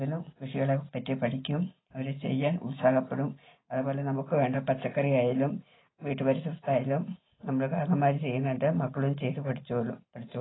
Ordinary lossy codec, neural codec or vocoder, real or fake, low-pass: AAC, 16 kbps; none; real; 7.2 kHz